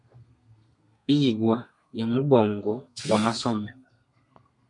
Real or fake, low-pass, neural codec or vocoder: fake; 10.8 kHz; codec, 32 kHz, 1.9 kbps, SNAC